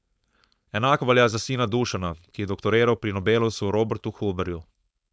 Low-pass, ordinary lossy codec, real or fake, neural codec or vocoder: none; none; fake; codec, 16 kHz, 4.8 kbps, FACodec